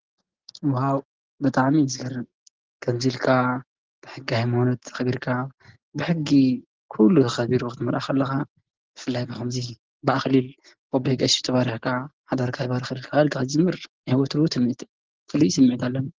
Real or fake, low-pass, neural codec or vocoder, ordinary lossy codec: real; 7.2 kHz; none; Opus, 16 kbps